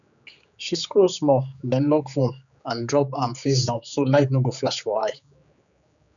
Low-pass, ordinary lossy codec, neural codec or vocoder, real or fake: 7.2 kHz; none; codec, 16 kHz, 4 kbps, X-Codec, HuBERT features, trained on general audio; fake